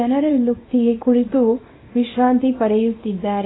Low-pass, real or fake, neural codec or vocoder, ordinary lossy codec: 7.2 kHz; fake; codec, 24 kHz, 0.9 kbps, WavTokenizer, small release; AAC, 16 kbps